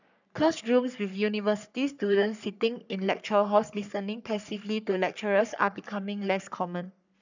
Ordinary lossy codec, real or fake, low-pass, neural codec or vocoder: none; fake; 7.2 kHz; codec, 44.1 kHz, 3.4 kbps, Pupu-Codec